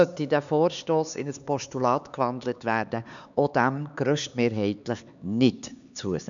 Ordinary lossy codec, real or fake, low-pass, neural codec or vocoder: none; fake; 7.2 kHz; codec, 16 kHz, 4 kbps, X-Codec, HuBERT features, trained on LibriSpeech